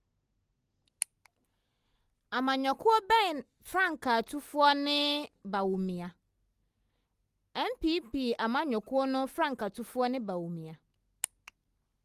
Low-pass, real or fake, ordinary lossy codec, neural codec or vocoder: 14.4 kHz; real; Opus, 24 kbps; none